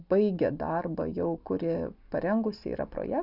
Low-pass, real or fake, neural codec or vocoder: 5.4 kHz; real; none